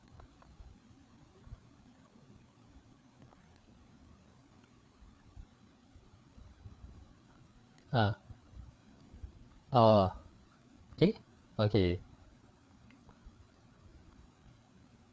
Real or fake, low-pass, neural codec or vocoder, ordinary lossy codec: fake; none; codec, 16 kHz, 4 kbps, FreqCodec, larger model; none